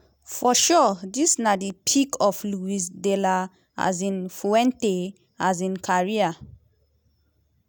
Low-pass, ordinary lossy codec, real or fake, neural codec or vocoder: none; none; real; none